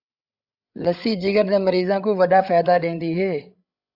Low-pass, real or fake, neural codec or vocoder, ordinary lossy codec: 5.4 kHz; fake; codec, 16 kHz, 16 kbps, FreqCodec, larger model; AAC, 48 kbps